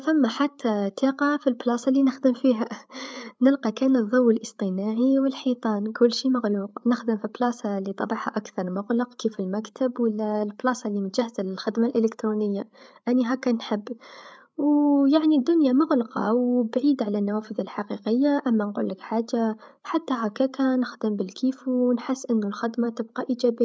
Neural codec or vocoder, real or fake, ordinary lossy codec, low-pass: codec, 16 kHz, 8 kbps, FreqCodec, larger model; fake; none; none